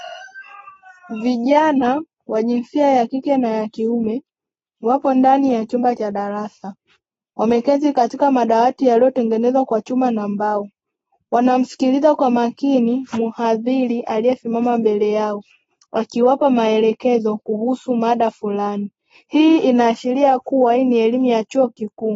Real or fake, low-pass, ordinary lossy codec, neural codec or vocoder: real; 10.8 kHz; AAC, 24 kbps; none